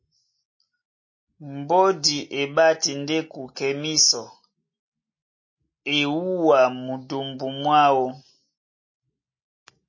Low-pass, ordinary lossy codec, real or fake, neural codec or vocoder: 7.2 kHz; MP3, 32 kbps; real; none